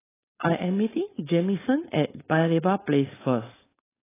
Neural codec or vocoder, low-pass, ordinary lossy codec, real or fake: codec, 16 kHz, 4.8 kbps, FACodec; 3.6 kHz; AAC, 16 kbps; fake